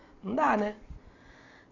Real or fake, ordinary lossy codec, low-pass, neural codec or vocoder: real; none; 7.2 kHz; none